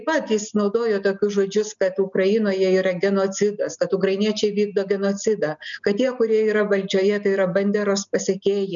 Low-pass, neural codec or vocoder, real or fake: 7.2 kHz; none; real